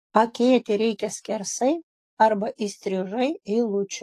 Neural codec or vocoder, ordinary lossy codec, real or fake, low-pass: codec, 44.1 kHz, 7.8 kbps, DAC; AAC, 48 kbps; fake; 14.4 kHz